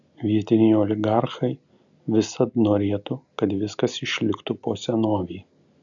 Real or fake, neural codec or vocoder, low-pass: real; none; 7.2 kHz